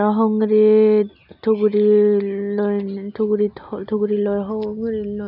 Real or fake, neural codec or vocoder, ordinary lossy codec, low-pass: real; none; none; 5.4 kHz